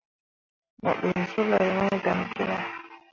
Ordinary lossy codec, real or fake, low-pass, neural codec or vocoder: MP3, 32 kbps; real; 7.2 kHz; none